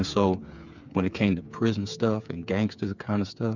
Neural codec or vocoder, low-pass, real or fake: codec, 16 kHz, 8 kbps, FreqCodec, smaller model; 7.2 kHz; fake